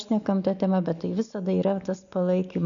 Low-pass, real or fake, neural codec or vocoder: 7.2 kHz; real; none